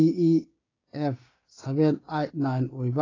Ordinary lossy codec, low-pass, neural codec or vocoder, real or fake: AAC, 32 kbps; 7.2 kHz; codec, 16 kHz, 6 kbps, DAC; fake